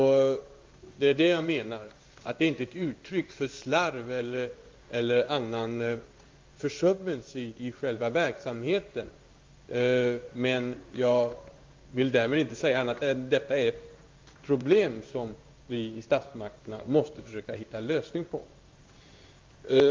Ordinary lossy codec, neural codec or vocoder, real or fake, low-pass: Opus, 24 kbps; codec, 16 kHz in and 24 kHz out, 1 kbps, XY-Tokenizer; fake; 7.2 kHz